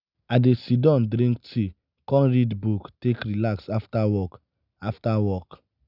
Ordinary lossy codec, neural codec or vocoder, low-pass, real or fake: none; none; 5.4 kHz; real